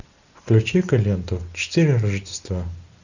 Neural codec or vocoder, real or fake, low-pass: none; real; 7.2 kHz